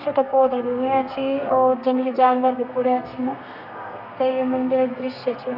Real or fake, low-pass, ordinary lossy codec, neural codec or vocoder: fake; 5.4 kHz; none; codec, 32 kHz, 1.9 kbps, SNAC